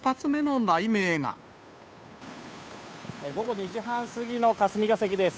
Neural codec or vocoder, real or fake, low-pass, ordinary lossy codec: codec, 16 kHz, 2 kbps, FunCodec, trained on Chinese and English, 25 frames a second; fake; none; none